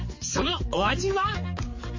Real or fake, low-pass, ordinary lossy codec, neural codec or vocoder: fake; 7.2 kHz; MP3, 32 kbps; codec, 16 kHz, 4 kbps, X-Codec, HuBERT features, trained on general audio